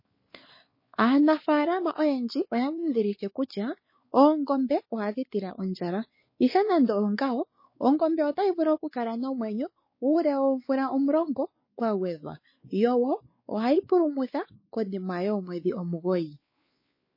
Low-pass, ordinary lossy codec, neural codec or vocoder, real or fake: 5.4 kHz; MP3, 24 kbps; codec, 16 kHz, 4 kbps, X-Codec, WavLM features, trained on Multilingual LibriSpeech; fake